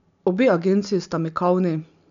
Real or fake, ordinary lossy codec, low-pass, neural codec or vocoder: real; none; 7.2 kHz; none